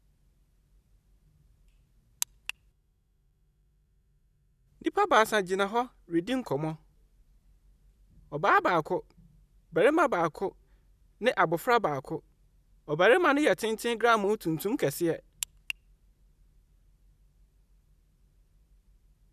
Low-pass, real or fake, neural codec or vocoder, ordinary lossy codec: 14.4 kHz; real; none; none